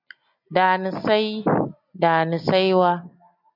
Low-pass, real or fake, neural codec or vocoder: 5.4 kHz; real; none